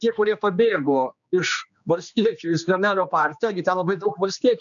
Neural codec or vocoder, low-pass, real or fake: codec, 16 kHz, 2 kbps, X-Codec, HuBERT features, trained on general audio; 7.2 kHz; fake